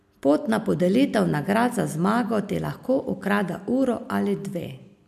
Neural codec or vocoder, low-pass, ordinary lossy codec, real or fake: none; 14.4 kHz; MP3, 96 kbps; real